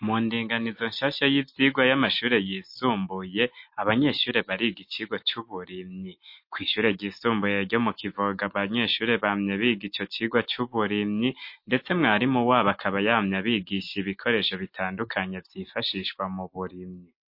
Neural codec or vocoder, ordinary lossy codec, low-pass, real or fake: none; MP3, 32 kbps; 5.4 kHz; real